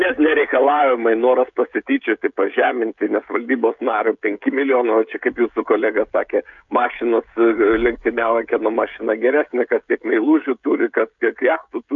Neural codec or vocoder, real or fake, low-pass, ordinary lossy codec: codec, 16 kHz, 16 kbps, FreqCodec, smaller model; fake; 7.2 kHz; MP3, 64 kbps